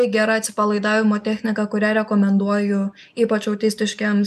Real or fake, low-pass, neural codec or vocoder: real; 14.4 kHz; none